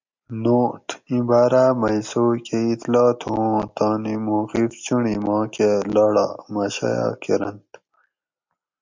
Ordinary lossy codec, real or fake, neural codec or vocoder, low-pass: MP3, 64 kbps; real; none; 7.2 kHz